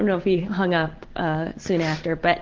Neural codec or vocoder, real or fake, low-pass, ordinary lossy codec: none; real; 7.2 kHz; Opus, 16 kbps